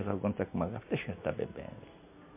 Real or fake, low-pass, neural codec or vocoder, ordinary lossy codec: real; 3.6 kHz; none; MP3, 24 kbps